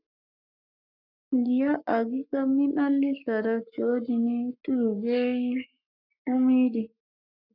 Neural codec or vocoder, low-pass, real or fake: codec, 44.1 kHz, 7.8 kbps, Pupu-Codec; 5.4 kHz; fake